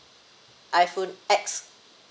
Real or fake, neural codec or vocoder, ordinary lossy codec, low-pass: real; none; none; none